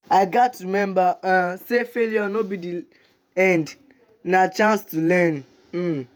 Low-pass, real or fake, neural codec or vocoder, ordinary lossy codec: none; fake; vocoder, 48 kHz, 128 mel bands, Vocos; none